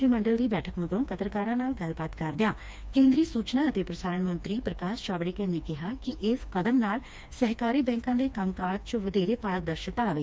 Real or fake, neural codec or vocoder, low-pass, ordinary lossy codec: fake; codec, 16 kHz, 2 kbps, FreqCodec, smaller model; none; none